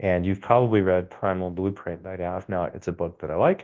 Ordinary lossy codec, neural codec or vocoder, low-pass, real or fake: Opus, 16 kbps; codec, 24 kHz, 0.9 kbps, WavTokenizer, large speech release; 7.2 kHz; fake